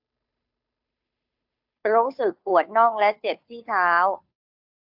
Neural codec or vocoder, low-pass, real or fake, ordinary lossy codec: codec, 16 kHz, 2 kbps, FunCodec, trained on Chinese and English, 25 frames a second; 5.4 kHz; fake; AAC, 48 kbps